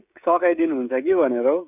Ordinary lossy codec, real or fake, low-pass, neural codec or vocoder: none; fake; 3.6 kHz; codec, 16 kHz, 16 kbps, FreqCodec, smaller model